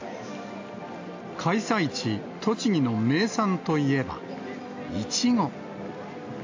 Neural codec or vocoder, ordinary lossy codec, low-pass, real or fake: none; AAC, 48 kbps; 7.2 kHz; real